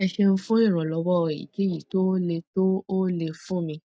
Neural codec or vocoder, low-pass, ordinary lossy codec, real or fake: none; none; none; real